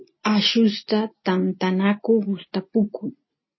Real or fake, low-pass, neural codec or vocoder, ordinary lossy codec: real; 7.2 kHz; none; MP3, 24 kbps